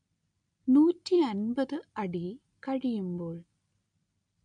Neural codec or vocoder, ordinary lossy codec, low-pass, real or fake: none; none; 9.9 kHz; real